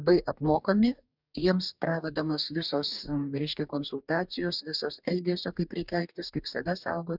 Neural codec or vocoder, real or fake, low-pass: codec, 44.1 kHz, 2.6 kbps, DAC; fake; 5.4 kHz